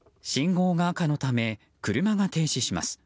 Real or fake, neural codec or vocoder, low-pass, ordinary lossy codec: real; none; none; none